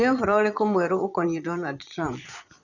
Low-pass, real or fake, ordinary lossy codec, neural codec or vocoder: 7.2 kHz; fake; none; vocoder, 22.05 kHz, 80 mel bands, Vocos